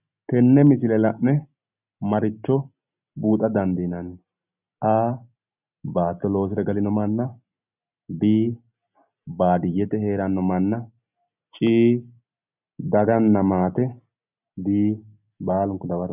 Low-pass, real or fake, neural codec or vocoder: 3.6 kHz; real; none